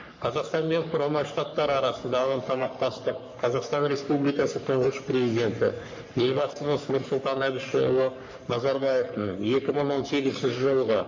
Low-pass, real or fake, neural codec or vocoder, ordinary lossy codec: 7.2 kHz; fake; codec, 44.1 kHz, 3.4 kbps, Pupu-Codec; MP3, 48 kbps